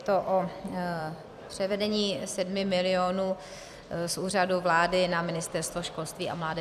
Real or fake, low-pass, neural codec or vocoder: fake; 14.4 kHz; vocoder, 44.1 kHz, 128 mel bands every 256 samples, BigVGAN v2